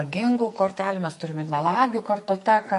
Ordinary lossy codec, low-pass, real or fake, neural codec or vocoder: MP3, 48 kbps; 14.4 kHz; fake; codec, 44.1 kHz, 2.6 kbps, SNAC